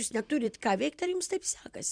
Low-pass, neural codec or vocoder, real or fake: 9.9 kHz; vocoder, 44.1 kHz, 128 mel bands every 256 samples, BigVGAN v2; fake